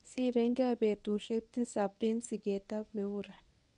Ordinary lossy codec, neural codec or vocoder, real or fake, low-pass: none; codec, 24 kHz, 0.9 kbps, WavTokenizer, medium speech release version 1; fake; 10.8 kHz